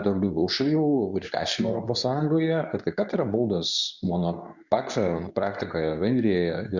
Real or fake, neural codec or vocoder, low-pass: fake; codec, 24 kHz, 0.9 kbps, WavTokenizer, medium speech release version 2; 7.2 kHz